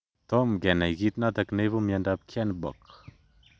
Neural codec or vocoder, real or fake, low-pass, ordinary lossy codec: none; real; none; none